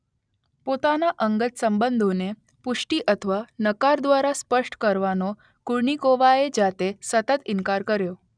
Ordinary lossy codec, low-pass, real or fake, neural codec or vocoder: none; 9.9 kHz; real; none